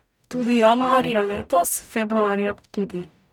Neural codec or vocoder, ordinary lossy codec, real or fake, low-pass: codec, 44.1 kHz, 0.9 kbps, DAC; none; fake; 19.8 kHz